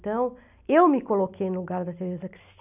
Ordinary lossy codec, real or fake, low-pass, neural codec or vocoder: none; real; 3.6 kHz; none